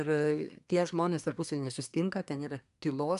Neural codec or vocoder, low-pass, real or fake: codec, 24 kHz, 1 kbps, SNAC; 10.8 kHz; fake